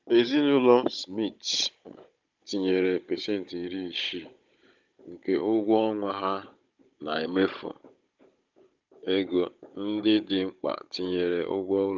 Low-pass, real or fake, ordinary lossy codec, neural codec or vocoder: 7.2 kHz; fake; Opus, 32 kbps; codec, 16 kHz, 16 kbps, FunCodec, trained on Chinese and English, 50 frames a second